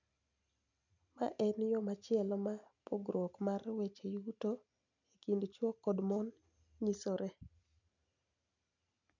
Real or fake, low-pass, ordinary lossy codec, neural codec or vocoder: real; 7.2 kHz; none; none